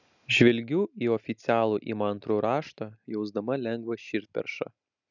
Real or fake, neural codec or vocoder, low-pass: real; none; 7.2 kHz